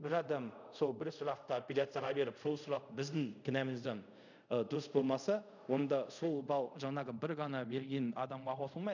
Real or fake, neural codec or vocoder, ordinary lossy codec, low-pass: fake; codec, 24 kHz, 0.5 kbps, DualCodec; none; 7.2 kHz